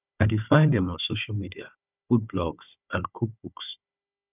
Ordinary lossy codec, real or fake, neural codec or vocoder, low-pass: none; fake; codec, 16 kHz, 4 kbps, FunCodec, trained on Chinese and English, 50 frames a second; 3.6 kHz